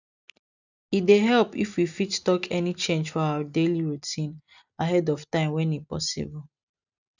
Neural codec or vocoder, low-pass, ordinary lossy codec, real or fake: none; 7.2 kHz; none; real